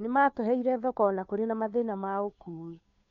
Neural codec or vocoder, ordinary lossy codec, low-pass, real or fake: codec, 16 kHz, 2 kbps, FunCodec, trained on Chinese and English, 25 frames a second; none; 7.2 kHz; fake